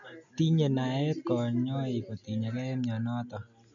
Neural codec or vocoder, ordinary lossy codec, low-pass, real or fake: none; none; 7.2 kHz; real